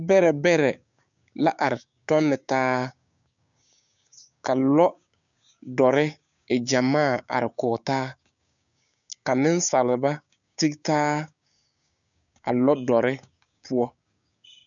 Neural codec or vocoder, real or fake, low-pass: codec, 16 kHz, 6 kbps, DAC; fake; 7.2 kHz